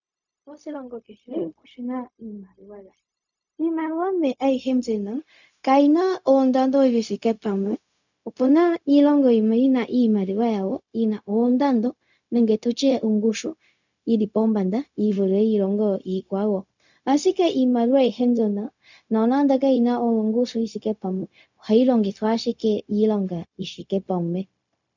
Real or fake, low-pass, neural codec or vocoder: fake; 7.2 kHz; codec, 16 kHz, 0.4 kbps, LongCat-Audio-Codec